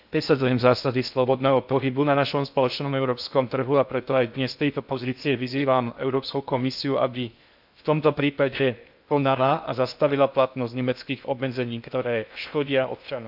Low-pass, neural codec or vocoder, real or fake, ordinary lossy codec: 5.4 kHz; codec, 16 kHz in and 24 kHz out, 0.6 kbps, FocalCodec, streaming, 2048 codes; fake; AAC, 48 kbps